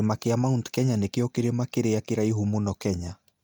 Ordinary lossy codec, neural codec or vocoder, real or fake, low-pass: none; none; real; none